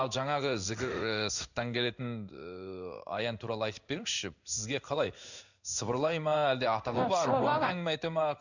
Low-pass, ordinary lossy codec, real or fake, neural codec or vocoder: 7.2 kHz; none; fake; codec, 16 kHz in and 24 kHz out, 1 kbps, XY-Tokenizer